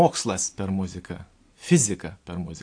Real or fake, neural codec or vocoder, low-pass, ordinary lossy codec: real; none; 9.9 kHz; AAC, 48 kbps